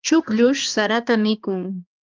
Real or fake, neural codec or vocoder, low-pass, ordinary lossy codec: fake; codec, 16 kHz, 2 kbps, X-Codec, HuBERT features, trained on balanced general audio; 7.2 kHz; Opus, 32 kbps